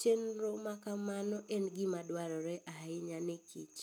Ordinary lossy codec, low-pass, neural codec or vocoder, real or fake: none; none; none; real